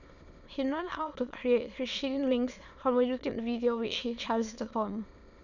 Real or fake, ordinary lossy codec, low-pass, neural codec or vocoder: fake; none; 7.2 kHz; autoencoder, 22.05 kHz, a latent of 192 numbers a frame, VITS, trained on many speakers